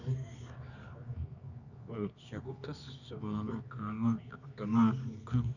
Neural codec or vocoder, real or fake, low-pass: codec, 24 kHz, 0.9 kbps, WavTokenizer, medium music audio release; fake; 7.2 kHz